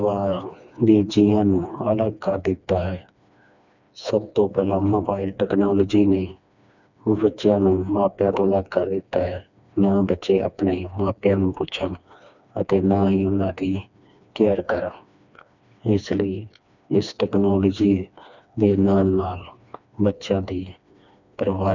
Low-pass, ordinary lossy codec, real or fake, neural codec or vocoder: 7.2 kHz; none; fake; codec, 16 kHz, 2 kbps, FreqCodec, smaller model